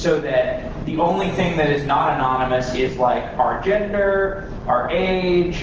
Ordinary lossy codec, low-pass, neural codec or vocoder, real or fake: Opus, 16 kbps; 7.2 kHz; none; real